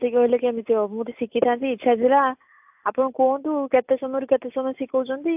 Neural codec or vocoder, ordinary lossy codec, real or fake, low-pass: none; AAC, 32 kbps; real; 3.6 kHz